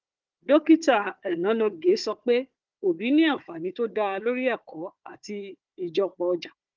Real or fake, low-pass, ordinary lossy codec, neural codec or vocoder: fake; 7.2 kHz; Opus, 32 kbps; codec, 16 kHz, 4 kbps, FunCodec, trained on Chinese and English, 50 frames a second